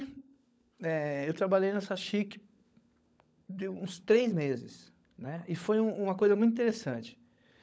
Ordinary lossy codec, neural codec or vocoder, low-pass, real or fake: none; codec, 16 kHz, 16 kbps, FunCodec, trained on LibriTTS, 50 frames a second; none; fake